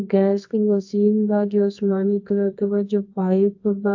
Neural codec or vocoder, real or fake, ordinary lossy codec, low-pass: codec, 24 kHz, 0.9 kbps, WavTokenizer, medium music audio release; fake; AAC, 48 kbps; 7.2 kHz